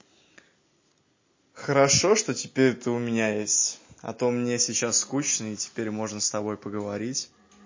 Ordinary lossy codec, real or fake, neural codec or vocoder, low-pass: MP3, 32 kbps; real; none; 7.2 kHz